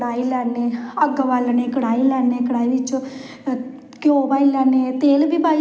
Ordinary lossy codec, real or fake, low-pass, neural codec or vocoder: none; real; none; none